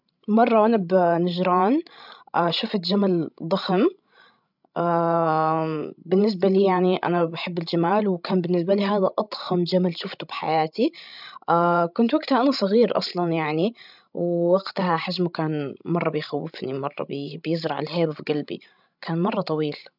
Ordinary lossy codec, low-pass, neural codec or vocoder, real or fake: none; 5.4 kHz; codec, 16 kHz, 16 kbps, FreqCodec, larger model; fake